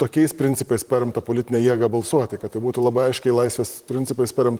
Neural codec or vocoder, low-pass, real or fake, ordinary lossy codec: vocoder, 44.1 kHz, 128 mel bands, Pupu-Vocoder; 19.8 kHz; fake; Opus, 24 kbps